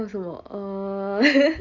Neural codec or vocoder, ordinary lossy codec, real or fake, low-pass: none; none; real; 7.2 kHz